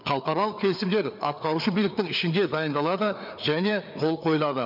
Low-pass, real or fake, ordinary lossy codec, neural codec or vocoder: 5.4 kHz; fake; none; codec, 16 kHz, 4 kbps, FreqCodec, larger model